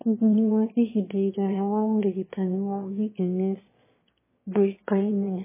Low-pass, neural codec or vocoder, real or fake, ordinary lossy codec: 3.6 kHz; autoencoder, 22.05 kHz, a latent of 192 numbers a frame, VITS, trained on one speaker; fake; MP3, 16 kbps